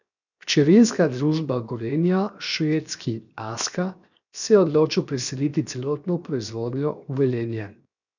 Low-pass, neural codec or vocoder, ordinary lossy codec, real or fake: 7.2 kHz; codec, 16 kHz, 0.7 kbps, FocalCodec; none; fake